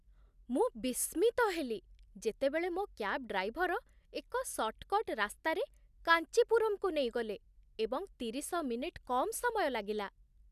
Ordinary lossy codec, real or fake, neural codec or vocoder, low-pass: none; real; none; 14.4 kHz